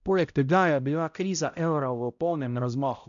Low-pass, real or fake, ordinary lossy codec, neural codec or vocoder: 7.2 kHz; fake; none; codec, 16 kHz, 0.5 kbps, X-Codec, HuBERT features, trained on balanced general audio